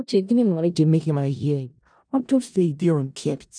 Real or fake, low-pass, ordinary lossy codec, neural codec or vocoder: fake; 9.9 kHz; none; codec, 16 kHz in and 24 kHz out, 0.4 kbps, LongCat-Audio-Codec, four codebook decoder